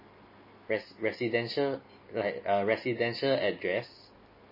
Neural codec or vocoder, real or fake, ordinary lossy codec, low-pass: none; real; MP3, 24 kbps; 5.4 kHz